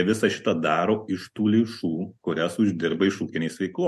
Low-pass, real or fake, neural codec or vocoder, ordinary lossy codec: 14.4 kHz; real; none; MP3, 64 kbps